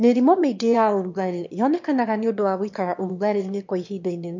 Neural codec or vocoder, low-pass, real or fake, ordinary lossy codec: autoencoder, 22.05 kHz, a latent of 192 numbers a frame, VITS, trained on one speaker; 7.2 kHz; fake; MP3, 48 kbps